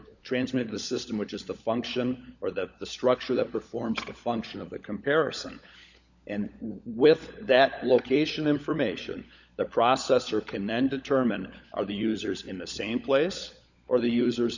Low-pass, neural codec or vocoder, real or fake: 7.2 kHz; codec, 16 kHz, 16 kbps, FunCodec, trained on LibriTTS, 50 frames a second; fake